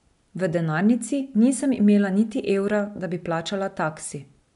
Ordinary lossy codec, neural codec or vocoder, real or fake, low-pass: none; none; real; 10.8 kHz